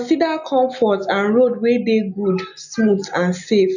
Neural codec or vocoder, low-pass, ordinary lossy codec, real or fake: none; 7.2 kHz; none; real